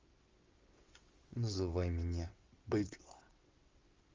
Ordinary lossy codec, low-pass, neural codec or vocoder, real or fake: Opus, 32 kbps; 7.2 kHz; vocoder, 22.05 kHz, 80 mel bands, WaveNeXt; fake